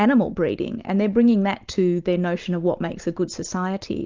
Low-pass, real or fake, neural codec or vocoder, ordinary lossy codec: 7.2 kHz; real; none; Opus, 24 kbps